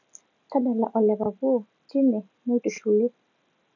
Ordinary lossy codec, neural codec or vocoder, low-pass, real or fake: none; none; 7.2 kHz; real